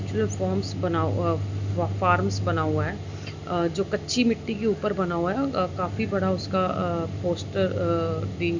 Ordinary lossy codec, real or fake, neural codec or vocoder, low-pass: MP3, 64 kbps; real; none; 7.2 kHz